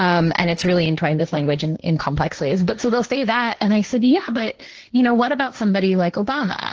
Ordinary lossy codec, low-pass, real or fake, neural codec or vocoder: Opus, 16 kbps; 7.2 kHz; fake; codec, 16 kHz, 1.1 kbps, Voila-Tokenizer